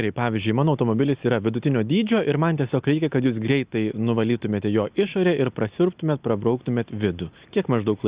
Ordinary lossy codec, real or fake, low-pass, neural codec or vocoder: Opus, 64 kbps; real; 3.6 kHz; none